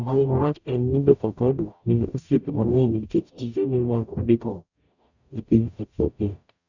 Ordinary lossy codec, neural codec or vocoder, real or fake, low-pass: none; codec, 44.1 kHz, 0.9 kbps, DAC; fake; 7.2 kHz